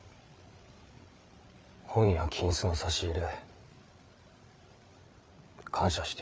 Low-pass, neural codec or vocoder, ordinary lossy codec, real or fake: none; codec, 16 kHz, 8 kbps, FreqCodec, larger model; none; fake